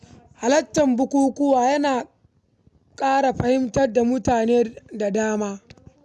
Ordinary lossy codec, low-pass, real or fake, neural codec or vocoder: none; none; real; none